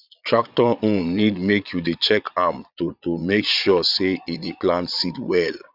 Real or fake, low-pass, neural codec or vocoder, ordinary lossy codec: fake; 5.4 kHz; vocoder, 22.05 kHz, 80 mel bands, Vocos; none